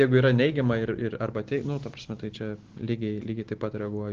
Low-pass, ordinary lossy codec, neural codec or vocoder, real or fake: 7.2 kHz; Opus, 16 kbps; none; real